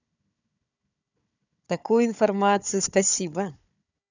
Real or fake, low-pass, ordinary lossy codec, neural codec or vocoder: fake; 7.2 kHz; none; codec, 16 kHz, 4 kbps, FunCodec, trained on Chinese and English, 50 frames a second